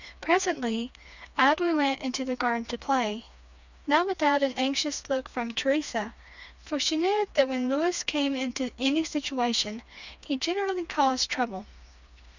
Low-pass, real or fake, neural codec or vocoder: 7.2 kHz; fake; codec, 16 kHz, 2 kbps, FreqCodec, smaller model